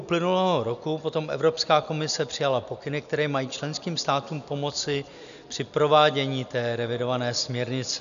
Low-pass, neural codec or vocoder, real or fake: 7.2 kHz; none; real